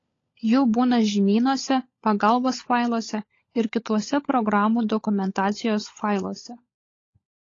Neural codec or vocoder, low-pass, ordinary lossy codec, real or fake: codec, 16 kHz, 16 kbps, FunCodec, trained on LibriTTS, 50 frames a second; 7.2 kHz; AAC, 32 kbps; fake